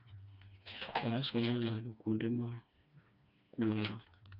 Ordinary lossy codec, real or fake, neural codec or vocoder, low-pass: none; fake; codec, 16 kHz, 2 kbps, FreqCodec, smaller model; 5.4 kHz